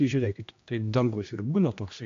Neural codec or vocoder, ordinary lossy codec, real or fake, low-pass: codec, 16 kHz, 1 kbps, X-Codec, HuBERT features, trained on general audio; AAC, 48 kbps; fake; 7.2 kHz